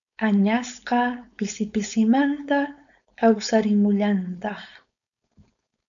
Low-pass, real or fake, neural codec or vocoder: 7.2 kHz; fake; codec, 16 kHz, 4.8 kbps, FACodec